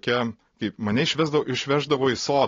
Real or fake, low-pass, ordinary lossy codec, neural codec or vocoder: real; 7.2 kHz; AAC, 32 kbps; none